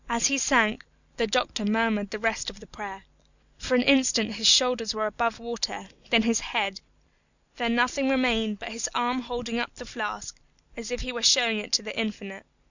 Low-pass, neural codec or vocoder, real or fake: 7.2 kHz; none; real